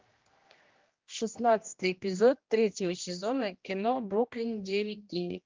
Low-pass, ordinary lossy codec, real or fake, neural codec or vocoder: 7.2 kHz; Opus, 24 kbps; fake; codec, 16 kHz, 1 kbps, X-Codec, HuBERT features, trained on general audio